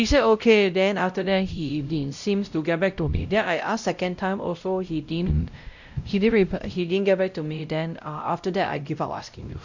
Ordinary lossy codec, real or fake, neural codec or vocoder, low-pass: none; fake; codec, 16 kHz, 0.5 kbps, X-Codec, WavLM features, trained on Multilingual LibriSpeech; 7.2 kHz